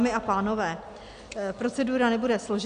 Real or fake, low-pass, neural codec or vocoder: real; 9.9 kHz; none